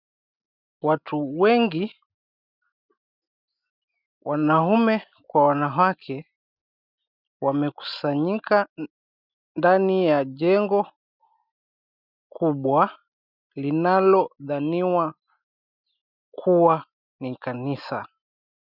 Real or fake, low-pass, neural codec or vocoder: real; 5.4 kHz; none